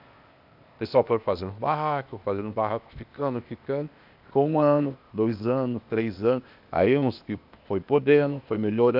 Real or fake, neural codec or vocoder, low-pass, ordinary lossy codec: fake; codec, 16 kHz, 0.8 kbps, ZipCodec; 5.4 kHz; none